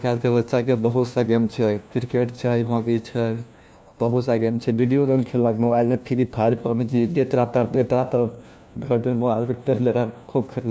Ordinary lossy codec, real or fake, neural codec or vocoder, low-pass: none; fake; codec, 16 kHz, 1 kbps, FunCodec, trained on LibriTTS, 50 frames a second; none